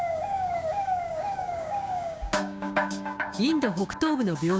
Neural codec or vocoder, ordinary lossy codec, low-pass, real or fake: codec, 16 kHz, 6 kbps, DAC; none; none; fake